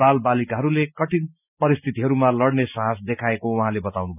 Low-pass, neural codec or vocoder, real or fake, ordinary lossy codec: 3.6 kHz; none; real; none